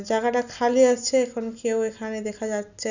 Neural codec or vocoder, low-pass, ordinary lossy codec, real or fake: none; 7.2 kHz; none; real